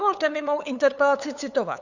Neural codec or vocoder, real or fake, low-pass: codec, 16 kHz, 8 kbps, FunCodec, trained on LibriTTS, 25 frames a second; fake; 7.2 kHz